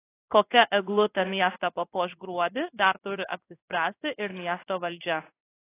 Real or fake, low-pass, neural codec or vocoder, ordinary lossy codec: fake; 3.6 kHz; codec, 16 kHz in and 24 kHz out, 1 kbps, XY-Tokenizer; AAC, 24 kbps